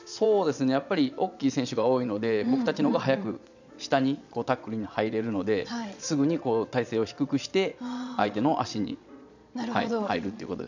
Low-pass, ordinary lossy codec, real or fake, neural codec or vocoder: 7.2 kHz; none; fake; vocoder, 22.05 kHz, 80 mel bands, Vocos